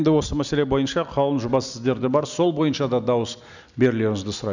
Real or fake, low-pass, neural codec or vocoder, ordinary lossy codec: real; 7.2 kHz; none; none